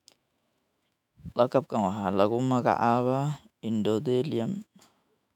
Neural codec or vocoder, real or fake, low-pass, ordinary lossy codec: autoencoder, 48 kHz, 128 numbers a frame, DAC-VAE, trained on Japanese speech; fake; 19.8 kHz; none